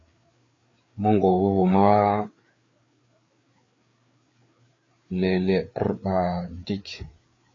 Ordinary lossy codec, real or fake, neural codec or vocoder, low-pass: AAC, 32 kbps; fake; codec, 16 kHz, 4 kbps, FreqCodec, larger model; 7.2 kHz